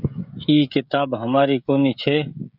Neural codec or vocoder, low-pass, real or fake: codec, 16 kHz, 16 kbps, FreqCodec, smaller model; 5.4 kHz; fake